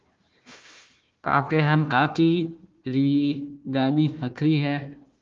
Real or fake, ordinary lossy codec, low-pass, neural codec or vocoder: fake; Opus, 32 kbps; 7.2 kHz; codec, 16 kHz, 1 kbps, FunCodec, trained on Chinese and English, 50 frames a second